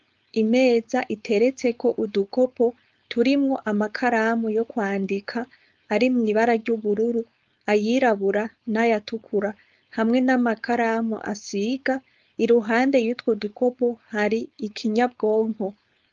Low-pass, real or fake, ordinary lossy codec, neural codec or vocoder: 7.2 kHz; fake; Opus, 32 kbps; codec, 16 kHz, 4.8 kbps, FACodec